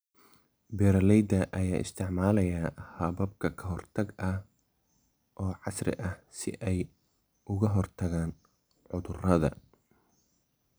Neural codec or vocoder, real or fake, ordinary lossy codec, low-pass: none; real; none; none